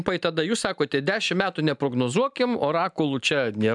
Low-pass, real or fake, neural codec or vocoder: 10.8 kHz; real; none